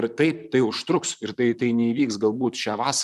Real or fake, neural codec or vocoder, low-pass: fake; vocoder, 44.1 kHz, 128 mel bands, Pupu-Vocoder; 14.4 kHz